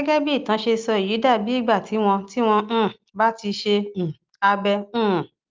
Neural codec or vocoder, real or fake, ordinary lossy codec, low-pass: none; real; Opus, 24 kbps; 7.2 kHz